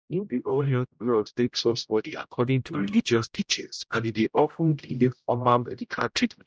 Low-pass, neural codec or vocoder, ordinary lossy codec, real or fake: none; codec, 16 kHz, 0.5 kbps, X-Codec, HuBERT features, trained on general audio; none; fake